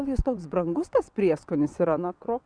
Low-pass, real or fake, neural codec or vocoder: 9.9 kHz; fake; vocoder, 22.05 kHz, 80 mel bands, WaveNeXt